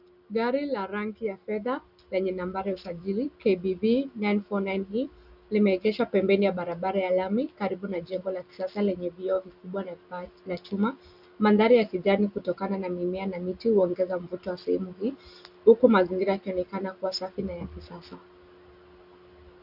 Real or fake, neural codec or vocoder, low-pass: real; none; 5.4 kHz